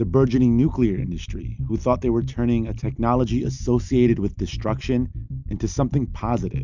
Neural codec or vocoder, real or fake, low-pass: none; real; 7.2 kHz